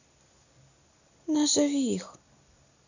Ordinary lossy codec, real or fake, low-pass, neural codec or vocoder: none; real; 7.2 kHz; none